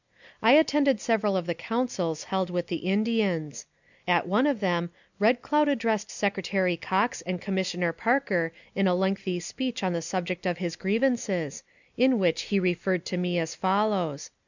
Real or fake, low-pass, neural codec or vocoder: real; 7.2 kHz; none